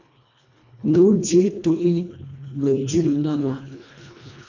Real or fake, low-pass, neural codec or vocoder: fake; 7.2 kHz; codec, 24 kHz, 1.5 kbps, HILCodec